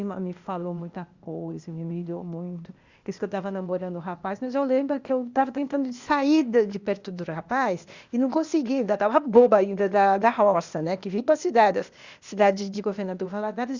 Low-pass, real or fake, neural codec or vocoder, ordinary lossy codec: 7.2 kHz; fake; codec, 16 kHz, 0.8 kbps, ZipCodec; Opus, 64 kbps